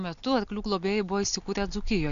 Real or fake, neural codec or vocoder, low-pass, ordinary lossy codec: real; none; 7.2 kHz; AAC, 96 kbps